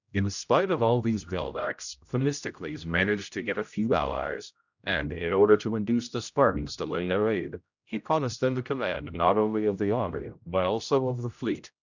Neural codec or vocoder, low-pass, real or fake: codec, 16 kHz, 0.5 kbps, X-Codec, HuBERT features, trained on general audio; 7.2 kHz; fake